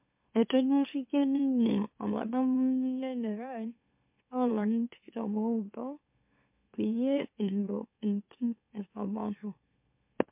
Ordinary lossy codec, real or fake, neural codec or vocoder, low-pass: MP3, 32 kbps; fake; autoencoder, 44.1 kHz, a latent of 192 numbers a frame, MeloTTS; 3.6 kHz